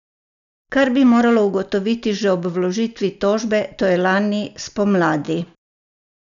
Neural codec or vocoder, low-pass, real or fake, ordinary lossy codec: none; 7.2 kHz; real; none